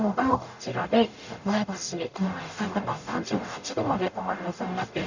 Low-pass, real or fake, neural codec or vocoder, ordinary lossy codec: 7.2 kHz; fake; codec, 44.1 kHz, 0.9 kbps, DAC; none